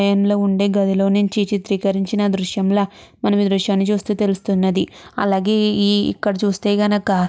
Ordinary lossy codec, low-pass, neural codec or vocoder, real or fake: none; none; none; real